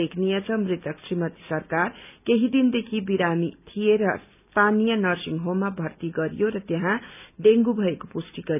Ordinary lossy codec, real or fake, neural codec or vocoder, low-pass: none; real; none; 3.6 kHz